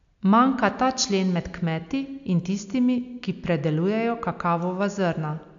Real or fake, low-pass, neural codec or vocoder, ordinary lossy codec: real; 7.2 kHz; none; AAC, 64 kbps